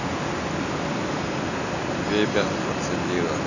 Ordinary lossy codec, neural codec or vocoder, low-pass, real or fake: none; none; 7.2 kHz; real